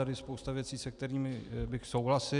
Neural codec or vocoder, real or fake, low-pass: none; real; 10.8 kHz